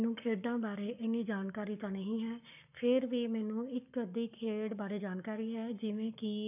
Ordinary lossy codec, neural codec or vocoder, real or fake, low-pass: none; codec, 44.1 kHz, 7.8 kbps, Pupu-Codec; fake; 3.6 kHz